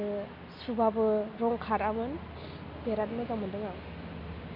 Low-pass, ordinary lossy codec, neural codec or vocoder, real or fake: 5.4 kHz; none; none; real